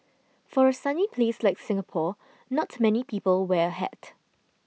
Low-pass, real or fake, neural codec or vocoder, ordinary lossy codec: none; real; none; none